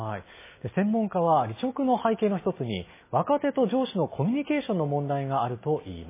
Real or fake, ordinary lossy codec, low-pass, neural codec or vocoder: real; MP3, 16 kbps; 3.6 kHz; none